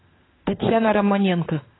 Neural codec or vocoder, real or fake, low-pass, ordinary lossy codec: autoencoder, 48 kHz, 32 numbers a frame, DAC-VAE, trained on Japanese speech; fake; 7.2 kHz; AAC, 16 kbps